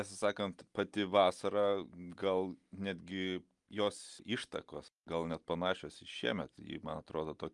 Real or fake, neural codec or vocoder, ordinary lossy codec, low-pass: real; none; Opus, 32 kbps; 10.8 kHz